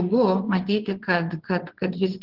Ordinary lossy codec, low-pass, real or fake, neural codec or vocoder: Opus, 16 kbps; 5.4 kHz; fake; vocoder, 22.05 kHz, 80 mel bands, WaveNeXt